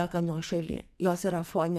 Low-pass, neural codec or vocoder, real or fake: 14.4 kHz; codec, 44.1 kHz, 2.6 kbps, SNAC; fake